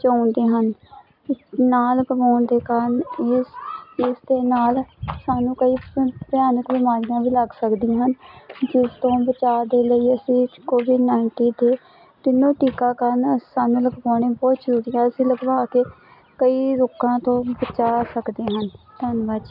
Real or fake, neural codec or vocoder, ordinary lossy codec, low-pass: real; none; none; 5.4 kHz